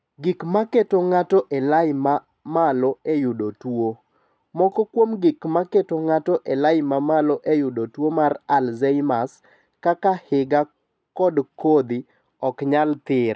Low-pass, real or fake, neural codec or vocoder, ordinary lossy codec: none; real; none; none